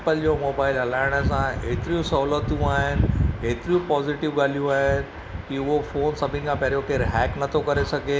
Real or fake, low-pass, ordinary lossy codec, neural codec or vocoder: real; none; none; none